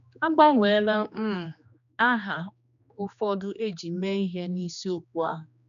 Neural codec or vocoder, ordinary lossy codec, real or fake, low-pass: codec, 16 kHz, 2 kbps, X-Codec, HuBERT features, trained on general audio; none; fake; 7.2 kHz